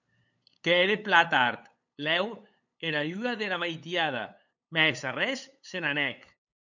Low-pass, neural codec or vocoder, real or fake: 7.2 kHz; codec, 16 kHz, 8 kbps, FunCodec, trained on LibriTTS, 25 frames a second; fake